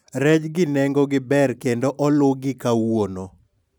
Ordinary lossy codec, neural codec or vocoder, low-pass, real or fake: none; none; none; real